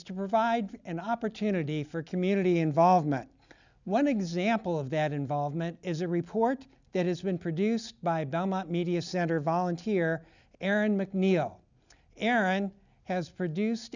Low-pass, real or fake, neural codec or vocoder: 7.2 kHz; real; none